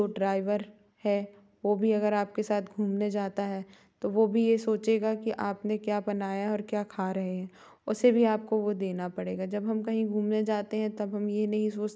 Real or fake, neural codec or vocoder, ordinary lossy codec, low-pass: real; none; none; none